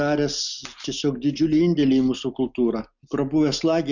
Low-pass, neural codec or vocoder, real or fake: 7.2 kHz; none; real